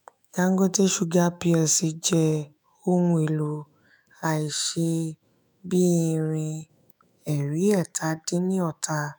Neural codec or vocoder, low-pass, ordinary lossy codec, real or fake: autoencoder, 48 kHz, 128 numbers a frame, DAC-VAE, trained on Japanese speech; none; none; fake